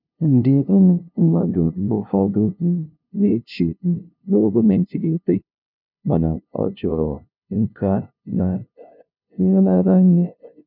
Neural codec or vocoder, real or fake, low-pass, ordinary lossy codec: codec, 16 kHz, 0.5 kbps, FunCodec, trained on LibriTTS, 25 frames a second; fake; 5.4 kHz; none